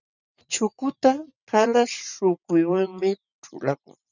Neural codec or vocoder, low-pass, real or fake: vocoder, 44.1 kHz, 80 mel bands, Vocos; 7.2 kHz; fake